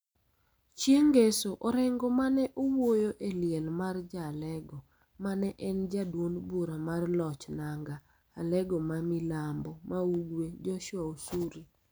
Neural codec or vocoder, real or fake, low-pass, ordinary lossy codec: none; real; none; none